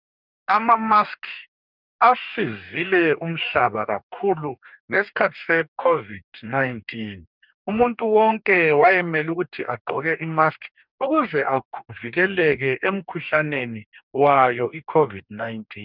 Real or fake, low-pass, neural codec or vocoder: fake; 5.4 kHz; codec, 44.1 kHz, 2.6 kbps, DAC